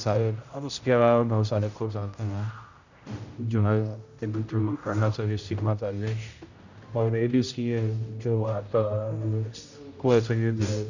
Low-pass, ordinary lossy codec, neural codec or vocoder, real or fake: 7.2 kHz; none; codec, 16 kHz, 0.5 kbps, X-Codec, HuBERT features, trained on general audio; fake